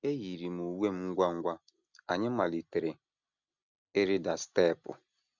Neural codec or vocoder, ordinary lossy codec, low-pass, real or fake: none; none; 7.2 kHz; real